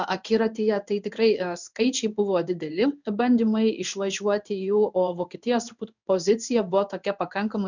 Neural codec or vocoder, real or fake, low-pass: codec, 16 kHz in and 24 kHz out, 1 kbps, XY-Tokenizer; fake; 7.2 kHz